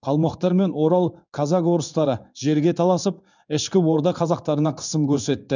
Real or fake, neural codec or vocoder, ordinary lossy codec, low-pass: fake; codec, 16 kHz in and 24 kHz out, 1 kbps, XY-Tokenizer; none; 7.2 kHz